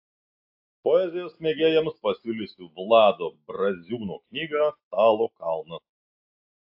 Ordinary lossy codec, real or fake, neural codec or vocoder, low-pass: AAC, 48 kbps; real; none; 5.4 kHz